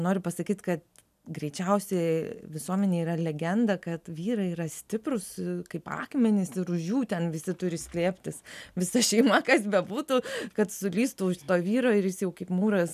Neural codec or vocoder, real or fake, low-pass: none; real; 14.4 kHz